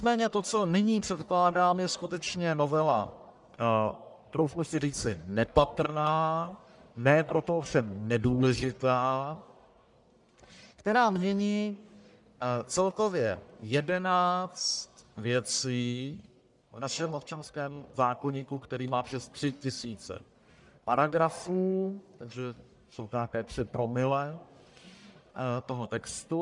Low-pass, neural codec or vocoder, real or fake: 10.8 kHz; codec, 44.1 kHz, 1.7 kbps, Pupu-Codec; fake